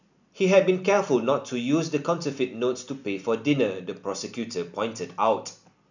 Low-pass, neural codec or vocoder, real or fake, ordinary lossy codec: 7.2 kHz; none; real; none